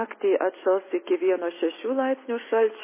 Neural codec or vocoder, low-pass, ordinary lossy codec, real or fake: none; 3.6 kHz; MP3, 16 kbps; real